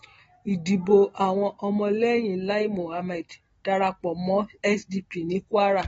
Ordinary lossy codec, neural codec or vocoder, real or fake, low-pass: AAC, 24 kbps; none; real; 19.8 kHz